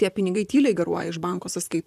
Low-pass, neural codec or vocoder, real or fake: 14.4 kHz; vocoder, 44.1 kHz, 128 mel bands, Pupu-Vocoder; fake